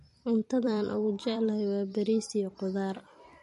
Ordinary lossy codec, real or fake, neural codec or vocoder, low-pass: MP3, 48 kbps; fake; vocoder, 44.1 kHz, 128 mel bands every 256 samples, BigVGAN v2; 14.4 kHz